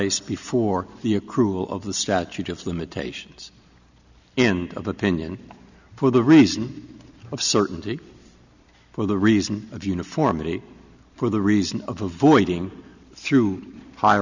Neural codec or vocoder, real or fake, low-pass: none; real; 7.2 kHz